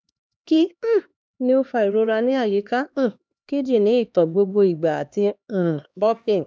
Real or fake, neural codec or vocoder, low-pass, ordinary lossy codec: fake; codec, 16 kHz, 2 kbps, X-Codec, HuBERT features, trained on LibriSpeech; none; none